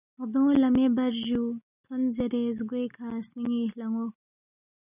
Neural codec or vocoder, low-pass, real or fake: none; 3.6 kHz; real